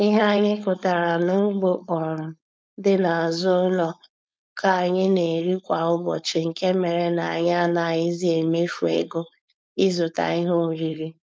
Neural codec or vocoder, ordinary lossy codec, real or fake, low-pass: codec, 16 kHz, 4.8 kbps, FACodec; none; fake; none